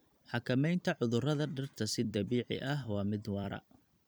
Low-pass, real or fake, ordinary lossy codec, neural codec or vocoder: none; fake; none; vocoder, 44.1 kHz, 128 mel bands every 256 samples, BigVGAN v2